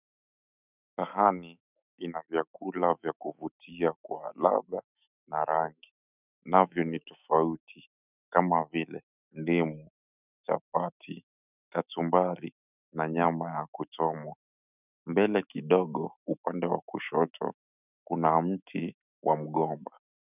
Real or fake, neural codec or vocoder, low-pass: fake; autoencoder, 48 kHz, 128 numbers a frame, DAC-VAE, trained on Japanese speech; 3.6 kHz